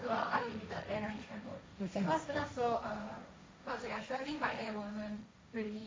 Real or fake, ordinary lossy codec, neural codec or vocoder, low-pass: fake; none; codec, 16 kHz, 1.1 kbps, Voila-Tokenizer; none